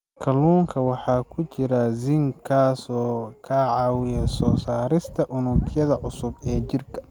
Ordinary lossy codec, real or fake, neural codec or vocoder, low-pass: Opus, 32 kbps; real; none; 19.8 kHz